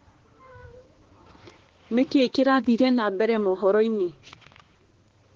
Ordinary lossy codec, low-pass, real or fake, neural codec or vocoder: Opus, 16 kbps; 7.2 kHz; fake; codec, 16 kHz, 2 kbps, X-Codec, HuBERT features, trained on balanced general audio